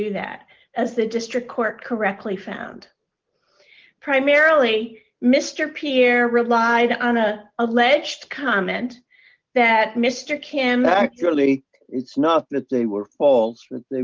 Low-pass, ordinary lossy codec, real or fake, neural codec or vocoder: 7.2 kHz; Opus, 32 kbps; real; none